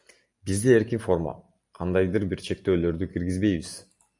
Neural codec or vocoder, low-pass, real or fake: none; 10.8 kHz; real